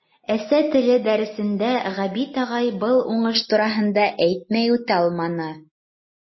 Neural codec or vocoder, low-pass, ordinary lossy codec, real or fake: none; 7.2 kHz; MP3, 24 kbps; real